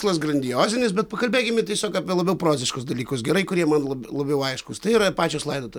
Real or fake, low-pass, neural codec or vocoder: real; 19.8 kHz; none